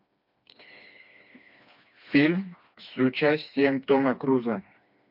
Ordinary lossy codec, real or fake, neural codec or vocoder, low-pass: MP3, 48 kbps; fake; codec, 16 kHz, 2 kbps, FreqCodec, smaller model; 5.4 kHz